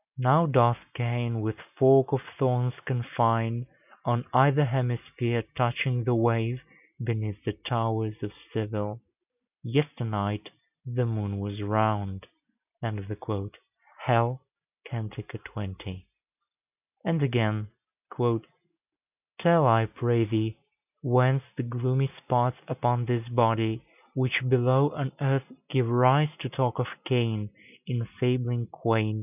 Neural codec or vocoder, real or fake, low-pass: none; real; 3.6 kHz